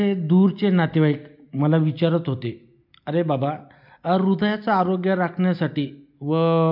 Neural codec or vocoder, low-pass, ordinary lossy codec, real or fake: none; 5.4 kHz; MP3, 48 kbps; real